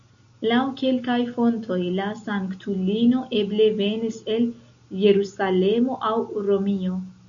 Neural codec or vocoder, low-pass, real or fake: none; 7.2 kHz; real